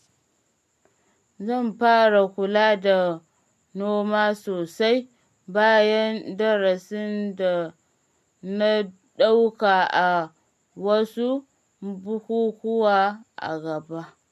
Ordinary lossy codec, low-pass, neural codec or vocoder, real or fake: MP3, 64 kbps; 14.4 kHz; none; real